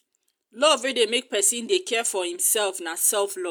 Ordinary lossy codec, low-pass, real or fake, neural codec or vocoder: none; none; real; none